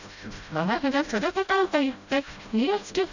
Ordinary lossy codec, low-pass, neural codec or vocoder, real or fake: none; 7.2 kHz; codec, 16 kHz, 0.5 kbps, FreqCodec, smaller model; fake